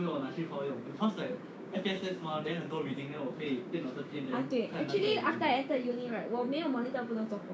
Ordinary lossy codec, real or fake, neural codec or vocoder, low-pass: none; fake; codec, 16 kHz, 6 kbps, DAC; none